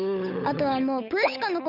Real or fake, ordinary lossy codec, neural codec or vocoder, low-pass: fake; none; codec, 16 kHz, 8 kbps, FunCodec, trained on Chinese and English, 25 frames a second; 5.4 kHz